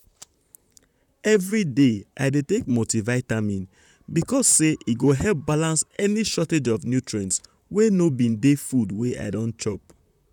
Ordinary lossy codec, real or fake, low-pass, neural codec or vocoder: none; fake; 19.8 kHz; vocoder, 44.1 kHz, 128 mel bands, Pupu-Vocoder